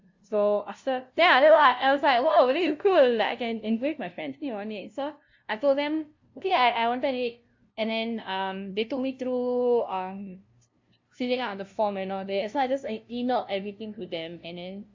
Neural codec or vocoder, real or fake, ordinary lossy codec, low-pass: codec, 16 kHz, 0.5 kbps, FunCodec, trained on LibriTTS, 25 frames a second; fake; AAC, 48 kbps; 7.2 kHz